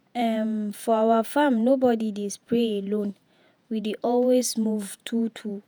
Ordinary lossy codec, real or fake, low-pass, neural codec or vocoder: none; fake; none; vocoder, 48 kHz, 128 mel bands, Vocos